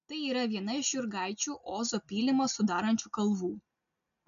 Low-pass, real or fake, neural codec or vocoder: 7.2 kHz; real; none